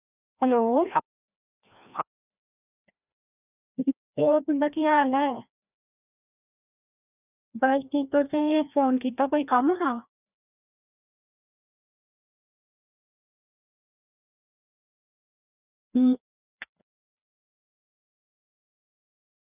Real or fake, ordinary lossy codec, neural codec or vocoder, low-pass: fake; none; codec, 16 kHz, 1 kbps, FreqCodec, larger model; 3.6 kHz